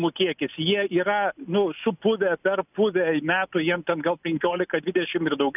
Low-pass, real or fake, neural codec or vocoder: 3.6 kHz; real; none